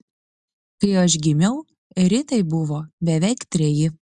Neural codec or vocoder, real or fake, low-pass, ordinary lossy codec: none; real; 10.8 kHz; Opus, 64 kbps